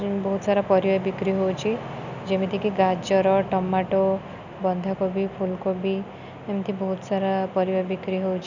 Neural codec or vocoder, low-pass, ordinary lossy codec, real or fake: none; 7.2 kHz; none; real